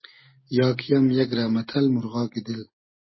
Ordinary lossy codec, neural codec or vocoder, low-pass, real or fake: MP3, 24 kbps; none; 7.2 kHz; real